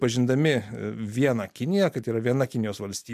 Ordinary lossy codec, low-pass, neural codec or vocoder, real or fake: MP3, 96 kbps; 14.4 kHz; none; real